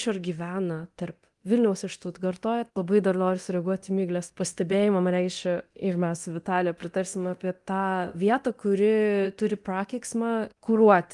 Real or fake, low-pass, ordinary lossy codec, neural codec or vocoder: fake; 10.8 kHz; Opus, 24 kbps; codec, 24 kHz, 0.9 kbps, DualCodec